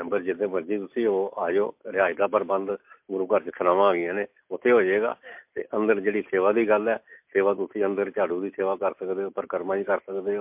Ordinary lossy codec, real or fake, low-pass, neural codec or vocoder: MP3, 32 kbps; real; 3.6 kHz; none